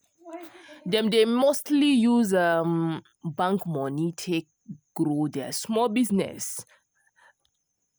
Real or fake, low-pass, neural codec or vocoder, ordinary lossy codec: real; none; none; none